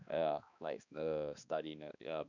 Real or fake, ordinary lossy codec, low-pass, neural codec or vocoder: fake; none; 7.2 kHz; codec, 16 kHz, 2 kbps, X-Codec, HuBERT features, trained on balanced general audio